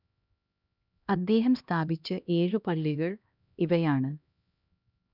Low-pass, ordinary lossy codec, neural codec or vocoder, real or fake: 5.4 kHz; none; codec, 16 kHz, 1 kbps, X-Codec, HuBERT features, trained on LibriSpeech; fake